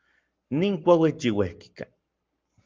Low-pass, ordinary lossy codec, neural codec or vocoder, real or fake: 7.2 kHz; Opus, 24 kbps; codec, 44.1 kHz, 7.8 kbps, Pupu-Codec; fake